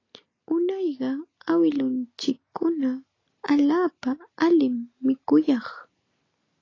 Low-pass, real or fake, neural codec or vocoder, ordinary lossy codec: 7.2 kHz; real; none; AAC, 32 kbps